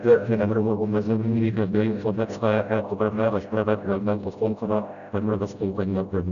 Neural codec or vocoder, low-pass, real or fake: codec, 16 kHz, 0.5 kbps, FreqCodec, smaller model; 7.2 kHz; fake